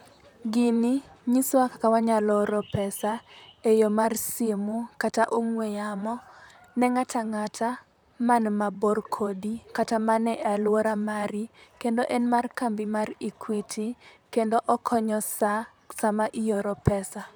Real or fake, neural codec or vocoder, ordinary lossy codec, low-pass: fake; vocoder, 44.1 kHz, 128 mel bands, Pupu-Vocoder; none; none